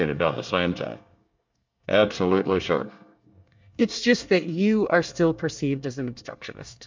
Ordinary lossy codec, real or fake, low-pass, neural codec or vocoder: MP3, 64 kbps; fake; 7.2 kHz; codec, 24 kHz, 1 kbps, SNAC